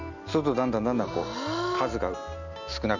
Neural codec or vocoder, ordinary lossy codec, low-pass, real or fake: none; none; 7.2 kHz; real